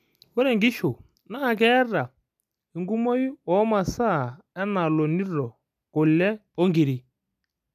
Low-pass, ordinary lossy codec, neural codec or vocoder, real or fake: 14.4 kHz; none; none; real